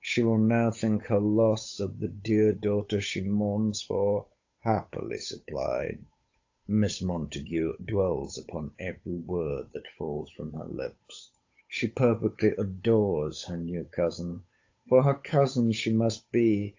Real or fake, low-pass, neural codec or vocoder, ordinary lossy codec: fake; 7.2 kHz; codec, 16 kHz, 8 kbps, FunCodec, trained on Chinese and English, 25 frames a second; AAC, 48 kbps